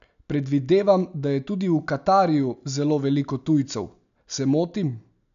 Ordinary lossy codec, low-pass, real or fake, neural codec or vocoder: none; 7.2 kHz; real; none